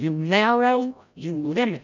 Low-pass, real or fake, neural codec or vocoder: 7.2 kHz; fake; codec, 16 kHz, 0.5 kbps, FreqCodec, larger model